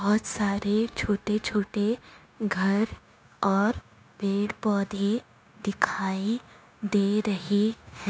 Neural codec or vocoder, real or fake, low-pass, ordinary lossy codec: codec, 16 kHz, 0.9 kbps, LongCat-Audio-Codec; fake; none; none